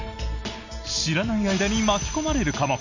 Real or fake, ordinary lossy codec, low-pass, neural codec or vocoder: real; none; 7.2 kHz; none